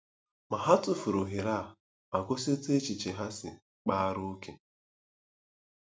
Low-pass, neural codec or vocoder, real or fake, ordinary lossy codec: none; none; real; none